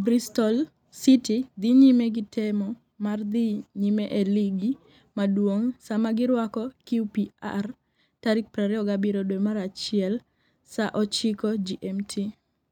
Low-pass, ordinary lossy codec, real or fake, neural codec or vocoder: 19.8 kHz; none; real; none